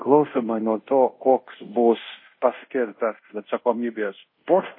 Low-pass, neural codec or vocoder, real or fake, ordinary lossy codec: 5.4 kHz; codec, 24 kHz, 0.5 kbps, DualCodec; fake; MP3, 24 kbps